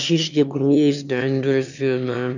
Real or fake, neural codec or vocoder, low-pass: fake; autoencoder, 22.05 kHz, a latent of 192 numbers a frame, VITS, trained on one speaker; 7.2 kHz